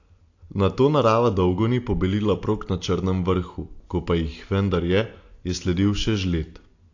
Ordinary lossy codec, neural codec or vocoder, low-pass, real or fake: AAC, 48 kbps; none; 7.2 kHz; real